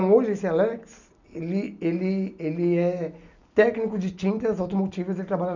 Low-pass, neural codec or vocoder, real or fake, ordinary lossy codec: 7.2 kHz; none; real; none